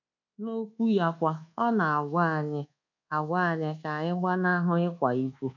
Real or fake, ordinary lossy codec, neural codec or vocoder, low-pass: fake; none; codec, 24 kHz, 1.2 kbps, DualCodec; 7.2 kHz